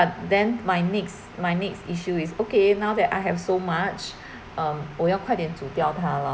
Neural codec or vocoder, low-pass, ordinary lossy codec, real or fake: none; none; none; real